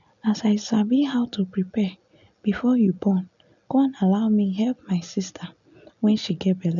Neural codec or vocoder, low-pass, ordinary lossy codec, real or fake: none; 7.2 kHz; none; real